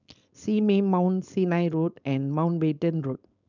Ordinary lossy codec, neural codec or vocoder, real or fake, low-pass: none; codec, 16 kHz, 4.8 kbps, FACodec; fake; 7.2 kHz